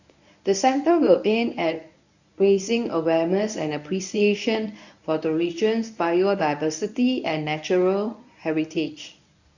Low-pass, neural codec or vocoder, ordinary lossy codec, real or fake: 7.2 kHz; codec, 24 kHz, 0.9 kbps, WavTokenizer, medium speech release version 1; none; fake